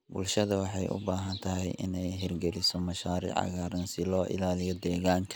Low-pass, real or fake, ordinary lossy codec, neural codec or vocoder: none; real; none; none